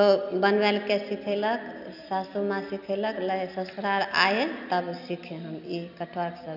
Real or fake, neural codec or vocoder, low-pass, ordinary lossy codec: real; none; 5.4 kHz; none